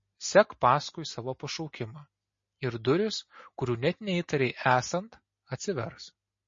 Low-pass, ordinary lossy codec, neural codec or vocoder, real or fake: 7.2 kHz; MP3, 32 kbps; none; real